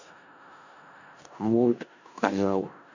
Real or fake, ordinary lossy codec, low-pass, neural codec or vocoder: fake; none; 7.2 kHz; codec, 16 kHz in and 24 kHz out, 0.4 kbps, LongCat-Audio-Codec, four codebook decoder